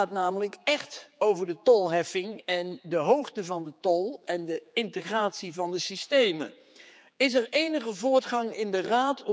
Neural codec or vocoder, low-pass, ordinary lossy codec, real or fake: codec, 16 kHz, 4 kbps, X-Codec, HuBERT features, trained on general audio; none; none; fake